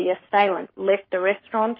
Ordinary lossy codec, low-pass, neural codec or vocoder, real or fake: MP3, 24 kbps; 5.4 kHz; codec, 44.1 kHz, 7.8 kbps, Pupu-Codec; fake